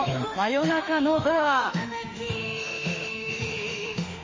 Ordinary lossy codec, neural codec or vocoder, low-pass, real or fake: MP3, 32 kbps; autoencoder, 48 kHz, 32 numbers a frame, DAC-VAE, trained on Japanese speech; 7.2 kHz; fake